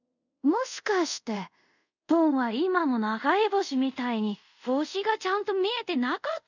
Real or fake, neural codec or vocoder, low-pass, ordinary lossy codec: fake; codec, 24 kHz, 0.5 kbps, DualCodec; 7.2 kHz; none